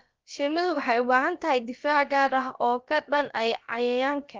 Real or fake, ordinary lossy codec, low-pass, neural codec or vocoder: fake; Opus, 32 kbps; 7.2 kHz; codec, 16 kHz, about 1 kbps, DyCAST, with the encoder's durations